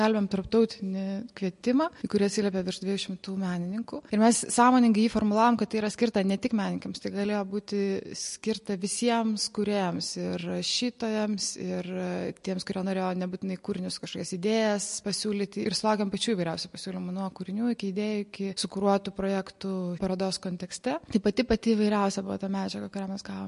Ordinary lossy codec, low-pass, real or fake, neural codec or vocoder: MP3, 48 kbps; 14.4 kHz; real; none